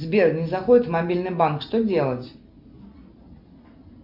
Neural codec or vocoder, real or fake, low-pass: none; real; 5.4 kHz